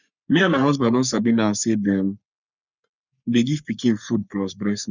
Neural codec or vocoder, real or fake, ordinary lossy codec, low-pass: codec, 44.1 kHz, 3.4 kbps, Pupu-Codec; fake; none; 7.2 kHz